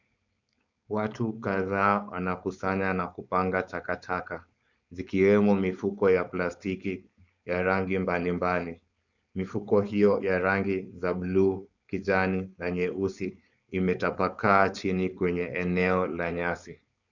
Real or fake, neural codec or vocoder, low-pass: fake; codec, 16 kHz, 4.8 kbps, FACodec; 7.2 kHz